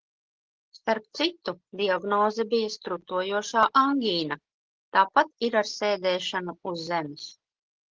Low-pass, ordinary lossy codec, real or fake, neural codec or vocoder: 7.2 kHz; Opus, 16 kbps; real; none